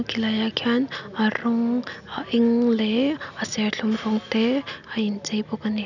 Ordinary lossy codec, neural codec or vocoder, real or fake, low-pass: none; none; real; 7.2 kHz